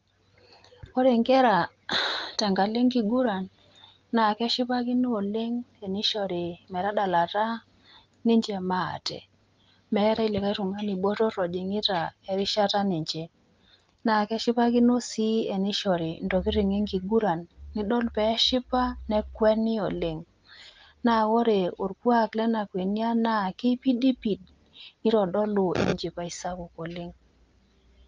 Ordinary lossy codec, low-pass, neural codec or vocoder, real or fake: Opus, 32 kbps; 7.2 kHz; none; real